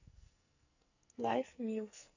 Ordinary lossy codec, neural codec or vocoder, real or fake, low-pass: none; codec, 44.1 kHz, 2.6 kbps, SNAC; fake; 7.2 kHz